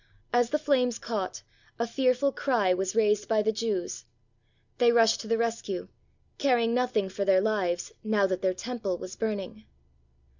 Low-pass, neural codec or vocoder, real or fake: 7.2 kHz; none; real